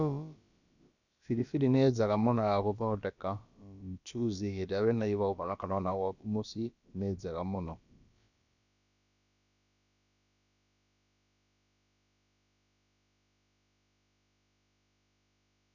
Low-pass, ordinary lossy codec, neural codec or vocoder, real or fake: 7.2 kHz; none; codec, 16 kHz, about 1 kbps, DyCAST, with the encoder's durations; fake